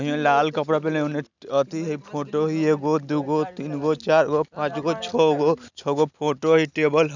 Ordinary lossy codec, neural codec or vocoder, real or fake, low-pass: none; none; real; 7.2 kHz